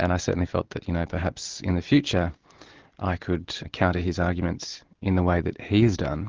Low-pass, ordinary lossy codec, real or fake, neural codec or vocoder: 7.2 kHz; Opus, 16 kbps; real; none